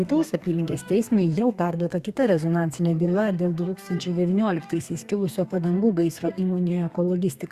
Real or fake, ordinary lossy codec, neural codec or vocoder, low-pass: fake; Opus, 64 kbps; codec, 32 kHz, 1.9 kbps, SNAC; 14.4 kHz